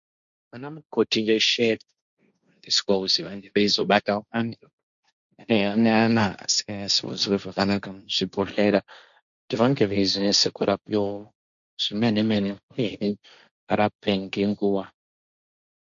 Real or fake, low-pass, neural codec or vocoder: fake; 7.2 kHz; codec, 16 kHz, 1.1 kbps, Voila-Tokenizer